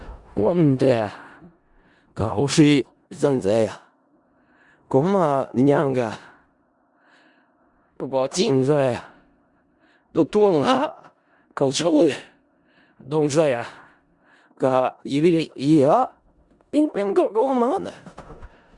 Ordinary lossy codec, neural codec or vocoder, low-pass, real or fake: Opus, 64 kbps; codec, 16 kHz in and 24 kHz out, 0.4 kbps, LongCat-Audio-Codec, four codebook decoder; 10.8 kHz; fake